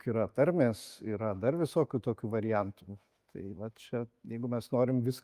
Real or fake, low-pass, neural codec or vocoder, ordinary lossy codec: fake; 14.4 kHz; autoencoder, 48 kHz, 128 numbers a frame, DAC-VAE, trained on Japanese speech; Opus, 32 kbps